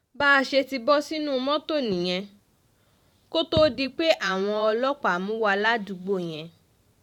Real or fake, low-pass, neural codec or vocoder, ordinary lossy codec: fake; 19.8 kHz; vocoder, 44.1 kHz, 128 mel bands every 512 samples, BigVGAN v2; none